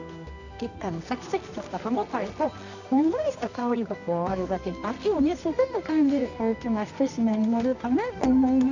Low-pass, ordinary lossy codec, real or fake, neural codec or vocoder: 7.2 kHz; none; fake; codec, 24 kHz, 0.9 kbps, WavTokenizer, medium music audio release